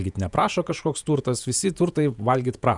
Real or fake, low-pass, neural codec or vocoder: fake; 10.8 kHz; vocoder, 48 kHz, 128 mel bands, Vocos